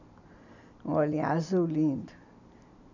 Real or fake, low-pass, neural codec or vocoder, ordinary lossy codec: real; 7.2 kHz; none; none